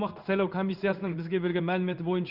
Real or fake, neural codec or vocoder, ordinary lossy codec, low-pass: fake; codec, 16 kHz in and 24 kHz out, 1 kbps, XY-Tokenizer; none; 5.4 kHz